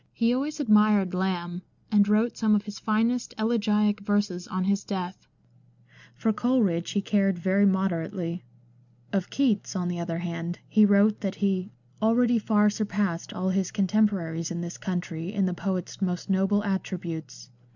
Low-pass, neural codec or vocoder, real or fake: 7.2 kHz; none; real